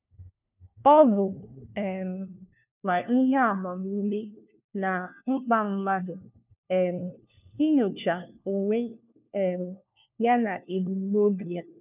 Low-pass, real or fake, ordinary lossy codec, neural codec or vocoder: 3.6 kHz; fake; none; codec, 16 kHz, 1 kbps, FunCodec, trained on LibriTTS, 50 frames a second